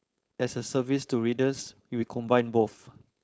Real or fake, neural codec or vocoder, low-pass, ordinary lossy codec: fake; codec, 16 kHz, 4.8 kbps, FACodec; none; none